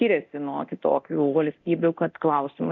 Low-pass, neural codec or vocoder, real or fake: 7.2 kHz; codec, 24 kHz, 0.9 kbps, DualCodec; fake